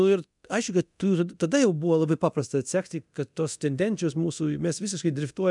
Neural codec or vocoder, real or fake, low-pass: codec, 24 kHz, 0.9 kbps, DualCodec; fake; 10.8 kHz